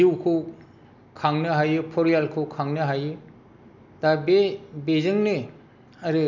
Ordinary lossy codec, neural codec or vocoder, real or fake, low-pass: none; none; real; 7.2 kHz